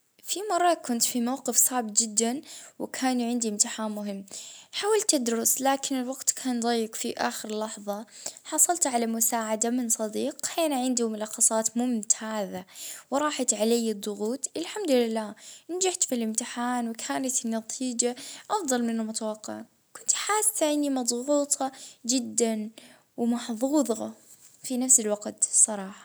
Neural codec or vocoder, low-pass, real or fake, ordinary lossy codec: none; none; real; none